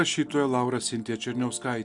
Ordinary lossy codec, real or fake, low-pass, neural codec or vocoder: MP3, 96 kbps; real; 10.8 kHz; none